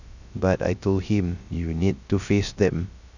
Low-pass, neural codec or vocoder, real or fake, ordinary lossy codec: 7.2 kHz; codec, 16 kHz, 0.3 kbps, FocalCodec; fake; none